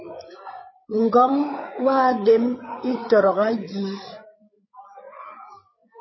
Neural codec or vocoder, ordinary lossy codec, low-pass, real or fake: codec, 16 kHz, 8 kbps, FreqCodec, larger model; MP3, 24 kbps; 7.2 kHz; fake